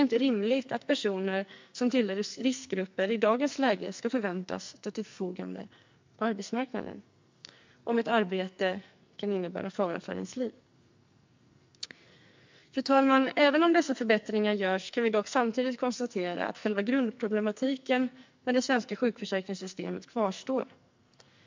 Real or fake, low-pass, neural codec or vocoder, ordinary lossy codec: fake; 7.2 kHz; codec, 44.1 kHz, 2.6 kbps, SNAC; MP3, 64 kbps